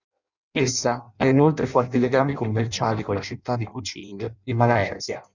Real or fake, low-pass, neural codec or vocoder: fake; 7.2 kHz; codec, 16 kHz in and 24 kHz out, 0.6 kbps, FireRedTTS-2 codec